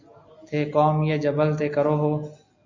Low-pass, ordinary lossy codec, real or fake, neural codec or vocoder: 7.2 kHz; MP3, 48 kbps; real; none